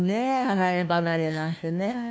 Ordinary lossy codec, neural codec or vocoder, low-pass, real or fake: none; codec, 16 kHz, 1 kbps, FunCodec, trained on LibriTTS, 50 frames a second; none; fake